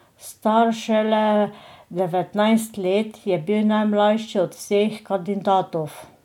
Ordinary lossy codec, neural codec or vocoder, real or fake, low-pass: none; none; real; 19.8 kHz